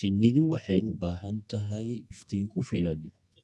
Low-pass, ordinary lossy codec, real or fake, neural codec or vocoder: none; none; fake; codec, 24 kHz, 0.9 kbps, WavTokenizer, medium music audio release